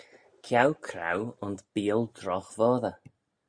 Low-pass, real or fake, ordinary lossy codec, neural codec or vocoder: 9.9 kHz; real; Opus, 64 kbps; none